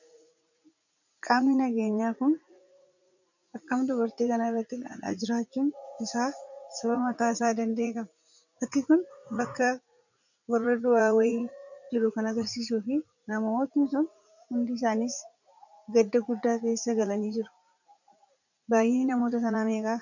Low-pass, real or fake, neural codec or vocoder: 7.2 kHz; fake; vocoder, 44.1 kHz, 80 mel bands, Vocos